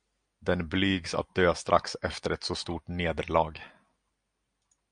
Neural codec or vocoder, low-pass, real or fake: none; 9.9 kHz; real